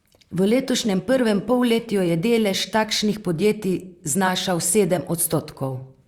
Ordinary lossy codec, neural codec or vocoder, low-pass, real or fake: Opus, 64 kbps; vocoder, 44.1 kHz, 128 mel bands, Pupu-Vocoder; 19.8 kHz; fake